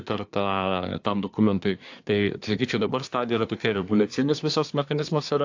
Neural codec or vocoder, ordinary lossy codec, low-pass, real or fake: codec, 24 kHz, 1 kbps, SNAC; AAC, 48 kbps; 7.2 kHz; fake